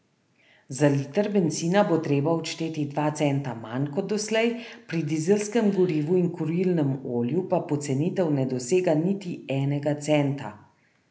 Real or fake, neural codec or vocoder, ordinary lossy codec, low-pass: real; none; none; none